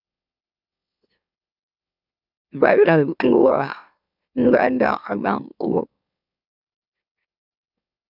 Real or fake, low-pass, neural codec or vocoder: fake; 5.4 kHz; autoencoder, 44.1 kHz, a latent of 192 numbers a frame, MeloTTS